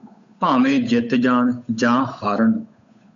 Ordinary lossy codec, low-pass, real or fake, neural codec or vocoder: MP3, 64 kbps; 7.2 kHz; fake; codec, 16 kHz, 8 kbps, FunCodec, trained on Chinese and English, 25 frames a second